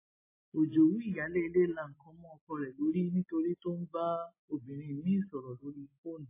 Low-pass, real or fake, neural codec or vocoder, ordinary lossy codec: 3.6 kHz; real; none; MP3, 16 kbps